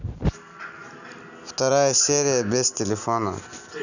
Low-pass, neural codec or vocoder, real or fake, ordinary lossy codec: 7.2 kHz; none; real; none